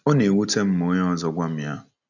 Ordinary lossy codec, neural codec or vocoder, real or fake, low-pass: none; none; real; 7.2 kHz